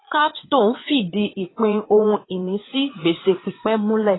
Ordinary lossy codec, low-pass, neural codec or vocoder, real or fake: AAC, 16 kbps; 7.2 kHz; vocoder, 22.05 kHz, 80 mel bands, Vocos; fake